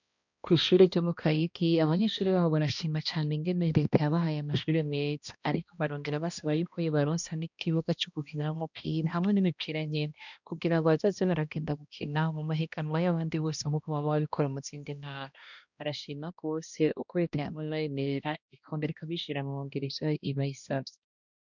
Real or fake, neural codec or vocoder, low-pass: fake; codec, 16 kHz, 1 kbps, X-Codec, HuBERT features, trained on balanced general audio; 7.2 kHz